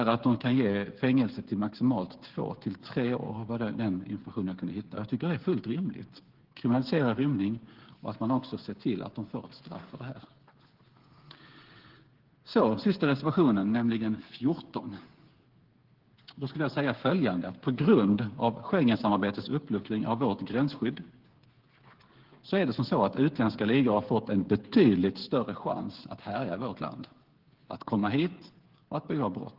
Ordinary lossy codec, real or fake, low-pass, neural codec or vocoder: Opus, 16 kbps; fake; 5.4 kHz; codec, 16 kHz, 8 kbps, FreqCodec, smaller model